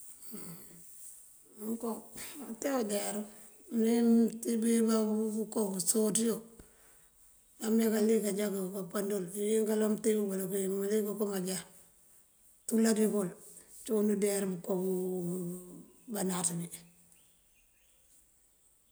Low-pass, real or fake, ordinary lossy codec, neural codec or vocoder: none; real; none; none